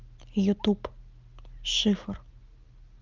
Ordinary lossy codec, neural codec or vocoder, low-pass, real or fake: Opus, 32 kbps; none; 7.2 kHz; real